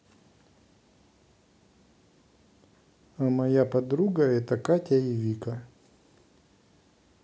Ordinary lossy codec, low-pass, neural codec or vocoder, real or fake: none; none; none; real